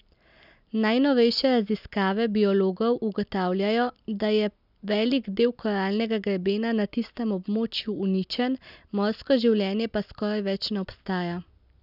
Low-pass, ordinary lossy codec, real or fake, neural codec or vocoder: 5.4 kHz; none; real; none